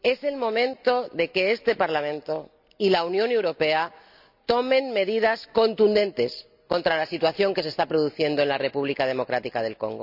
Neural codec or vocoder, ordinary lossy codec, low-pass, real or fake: none; none; 5.4 kHz; real